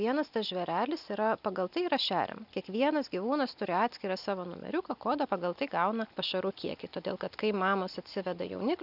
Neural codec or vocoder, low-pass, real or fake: none; 5.4 kHz; real